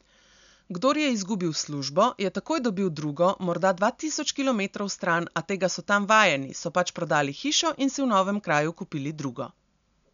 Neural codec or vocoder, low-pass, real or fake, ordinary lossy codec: none; 7.2 kHz; real; none